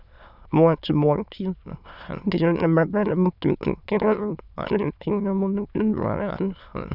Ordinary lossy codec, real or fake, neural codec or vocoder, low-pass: none; fake; autoencoder, 22.05 kHz, a latent of 192 numbers a frame, VITS, trained on many speakers; 5.4 kHz